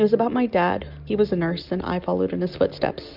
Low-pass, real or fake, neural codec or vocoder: 5.4 kHz; real; none